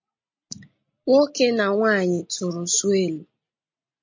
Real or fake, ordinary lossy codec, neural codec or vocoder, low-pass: real; MP3, 48 kbps; none; 7.2 kHz